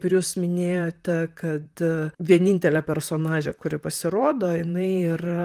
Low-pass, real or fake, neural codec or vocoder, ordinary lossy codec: 14.4 kHz; fake; vocoder, 44.1 kHz, 128 mel bands, Pupu-Vocoder; Opus, 32 kbps